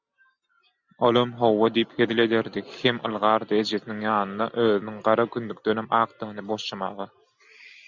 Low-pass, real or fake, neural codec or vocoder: 7.2 kHz; real; none